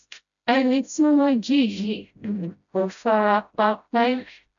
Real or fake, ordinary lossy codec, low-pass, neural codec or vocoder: fake; none; 7.2 kHz; codec, 16 kHz, 0.5 kbps, FreqCodec, smaller model